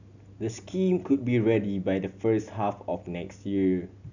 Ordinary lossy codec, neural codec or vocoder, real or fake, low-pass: none; none; real; 7.2 kHz